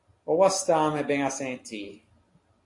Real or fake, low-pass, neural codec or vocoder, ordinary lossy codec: real; 10.8 kHz; none; AAC, 48 kbps